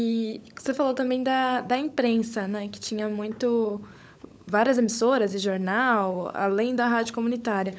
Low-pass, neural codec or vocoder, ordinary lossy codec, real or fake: none; codec, 16 kHz, 16 kbps, FunCodec, trained on LibriTTS, 50 frames a second; none; fake